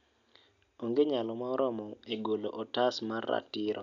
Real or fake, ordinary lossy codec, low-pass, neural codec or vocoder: real; none; 7.2 kHz; none